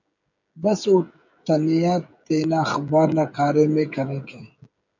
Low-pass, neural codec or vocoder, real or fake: 7.2 kHz; codec, 16 kHz, 16 kbps, FreqCodec, smaller model; fake